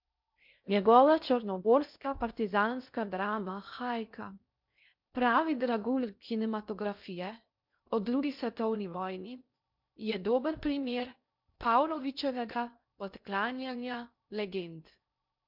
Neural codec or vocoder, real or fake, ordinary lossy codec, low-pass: codec, 16 kHz in and 24 kHz out, 0.6 kbps, FocalCodec, streaming, 4096 codes; fake; MP3, 48 kbps; 5.4 kHz